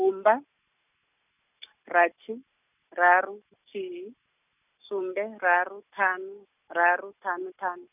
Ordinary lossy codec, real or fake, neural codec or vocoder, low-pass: none; real; none; 3.6 kHz